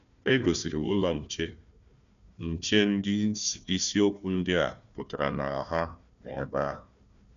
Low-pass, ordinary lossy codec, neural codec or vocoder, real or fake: 7.2 kHz; none; codec, 16 kHz, 1 kbps, FunCodec, trained on Chinese and English, 50 frames a second; fake